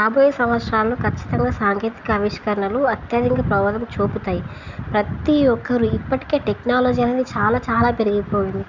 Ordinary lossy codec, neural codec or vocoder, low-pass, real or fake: Opus, 64 kbps; none; 7.2 kHz; real